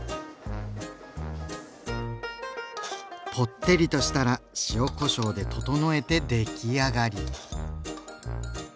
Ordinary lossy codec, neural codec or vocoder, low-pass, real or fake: none; none; none; real